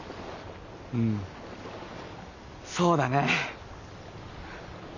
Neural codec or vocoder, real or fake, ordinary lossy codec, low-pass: none; real; none; 7.2 kHz